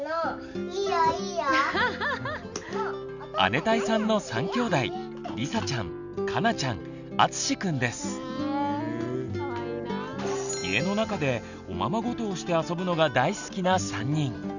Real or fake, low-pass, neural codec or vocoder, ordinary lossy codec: real; 7.2 kHz; none; none